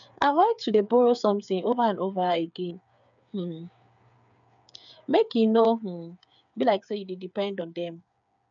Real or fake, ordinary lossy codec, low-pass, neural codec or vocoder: fake; MP3, 96 kbps; 7.2 kHz; codec, 16 kHz, 8 kbps, FreqCodec, smaller model